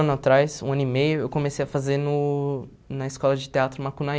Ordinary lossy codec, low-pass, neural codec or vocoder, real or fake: none; none; none; real